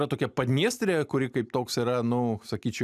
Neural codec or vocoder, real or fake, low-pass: none; real; 14.4 kHz